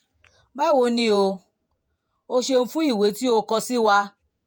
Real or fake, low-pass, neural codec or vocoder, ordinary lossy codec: fake; none; vocoder, 48 kHz, 128 mel bands, Vocos; none